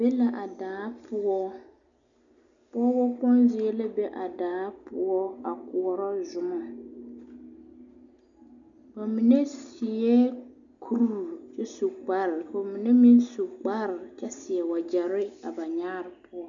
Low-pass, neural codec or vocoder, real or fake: 7.2 kHz; none; real